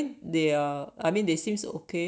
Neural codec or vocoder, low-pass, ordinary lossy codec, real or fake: none; none; none; real